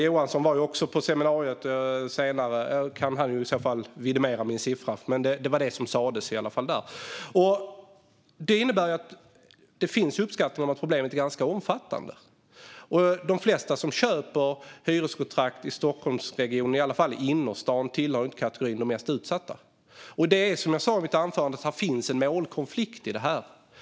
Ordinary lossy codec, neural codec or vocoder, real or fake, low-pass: none; none; real; none